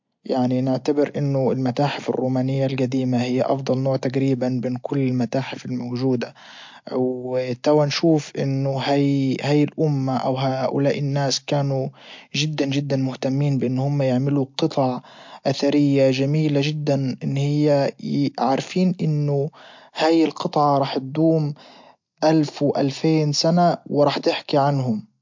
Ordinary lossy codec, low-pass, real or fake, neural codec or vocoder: MP3, 48 kbps; 7.2 kHz; real; none